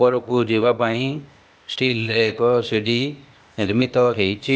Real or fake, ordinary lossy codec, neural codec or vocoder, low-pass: fake; none; codec, 16 kHz, 0.8 kbps, ZipCodec; none